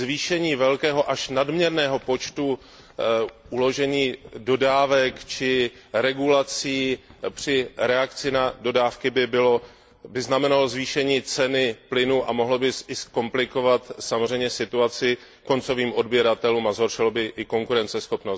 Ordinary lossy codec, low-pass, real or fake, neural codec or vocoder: none; none; real; none